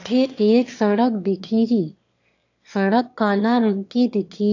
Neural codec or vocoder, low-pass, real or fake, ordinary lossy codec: autoencoder, 22.05 kHz, a latent of 192 numbers a frame, VITS, trained on one speaker; 7.2 kHz; fake; AAC, 48 kbps